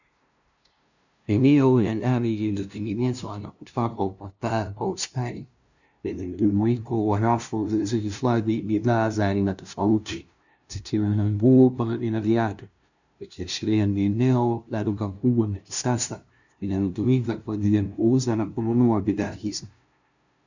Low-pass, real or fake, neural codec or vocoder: 7.2 kHz; fake; codec, 16 kHz, 0.5 kbps, FunCodec, trained on LibriTTS, 25 frames a second